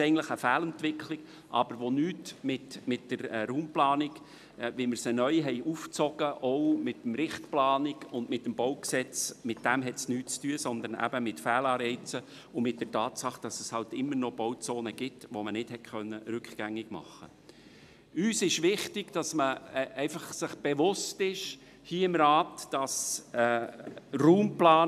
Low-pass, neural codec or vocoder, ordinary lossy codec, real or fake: 14.4 kHz; none; none; real